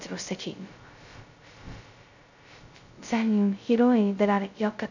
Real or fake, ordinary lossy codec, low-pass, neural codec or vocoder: fake; none; 7.2 kHz; codec, 16 kHz, 0.2 kbps, FocalCodec